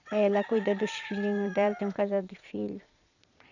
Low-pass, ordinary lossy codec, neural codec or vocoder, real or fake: 7.2 kHz; none; vocoder, 22.05 kHz, 80 mel bands, Vocos; fake